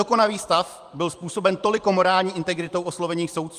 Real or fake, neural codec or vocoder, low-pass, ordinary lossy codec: fake; vocoder, 44.1 kHz, 128 mel bands every 512 samples, BigVGAN v2; 14.4 kHz; Opus, 32 kbps